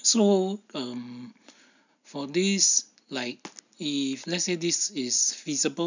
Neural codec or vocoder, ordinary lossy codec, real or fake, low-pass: none; none; real; 7.2 kHz